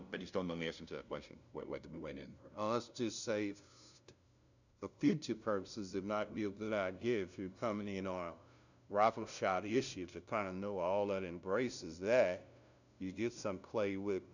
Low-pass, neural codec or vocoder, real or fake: 7.2 kHz; codec, 16 kHz, 0.5 kbps, FunCodec, trained on LibriTTS, 25 frames a second; fake